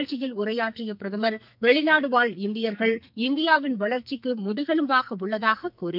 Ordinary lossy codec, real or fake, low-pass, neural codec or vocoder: none; fake; 5.4 kHz; codec, 44.1 kHz, 2.6 kbps, SNAC